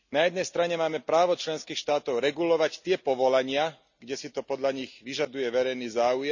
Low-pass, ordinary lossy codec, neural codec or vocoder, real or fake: 7.2 kHz; none; none; real